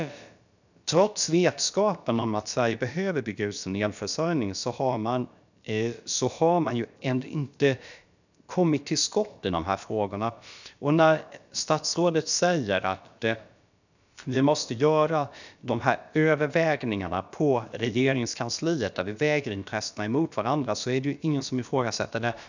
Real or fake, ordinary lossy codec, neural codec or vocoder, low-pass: fake; none; codec, 16 kHz, about 1 kbps, DyCAST, with the encoder's durations; 7.2 kHz